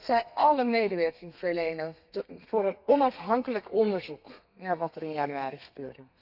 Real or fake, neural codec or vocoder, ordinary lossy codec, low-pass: fake; codec, 32 kHz, 1.9 kbps, SNAC; none; 5.4 kHz